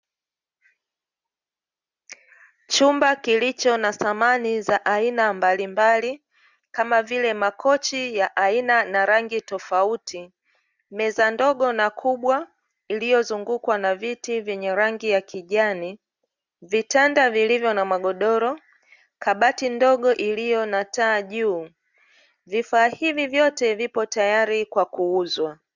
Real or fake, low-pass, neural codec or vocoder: real; 7.2 kHz; none